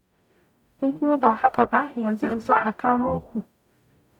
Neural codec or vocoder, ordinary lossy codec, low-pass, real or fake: codec, 44.1 kHz, 0.9 kbps, DAC; none; 19.8 kHz; fake